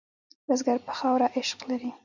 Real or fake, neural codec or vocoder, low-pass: real; none; 7.2 kHz